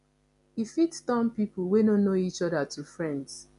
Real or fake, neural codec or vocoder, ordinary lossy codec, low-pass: real; none; none; 10.8 kHz